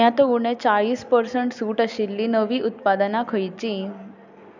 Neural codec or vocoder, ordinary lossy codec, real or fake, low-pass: none; none; real; 7.2 kHz